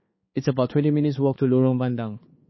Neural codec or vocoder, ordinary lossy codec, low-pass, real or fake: codec, 16 kHz, 4 kbps, X-Codec, HuBERT features, trained on balanced general audio; MP3, 24 kbps; 7.2 kHz; fake